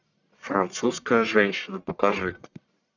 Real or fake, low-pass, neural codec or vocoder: fake; 7.2 kHz; codec, 44.1 kHz, 1.7 kbps, Pupu-Codec